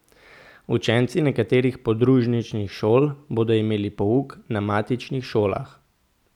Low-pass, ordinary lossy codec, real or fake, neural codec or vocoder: 19.8 kHz; none; real; none